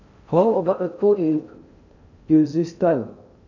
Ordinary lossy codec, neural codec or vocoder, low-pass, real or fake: none; codec, 16 kHz in and 24 kHz out, 0.6 kbps, FocalCodec, streaming, 4096 codes; 7.2 kHz; fake